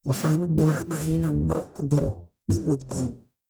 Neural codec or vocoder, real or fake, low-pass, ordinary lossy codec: codec, 44.1 kHz, 0.9 kbps, DAC; fake; none; none